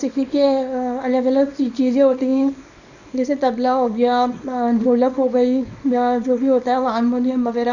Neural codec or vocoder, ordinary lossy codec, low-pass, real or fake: codec, 24 kHz, 0.9 kbps, WavTokenizer, small release; none; 7.2 kHz; fake